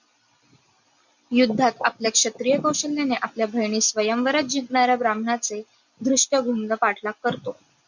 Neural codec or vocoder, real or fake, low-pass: none; real; 7.2 kHz